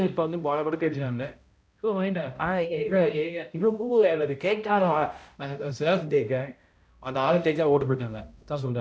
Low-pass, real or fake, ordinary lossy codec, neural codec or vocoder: none; fake; none; codec, 16 kHz, 0.5 kbps, X-Codec, HuBERT features, trained on balanced general audio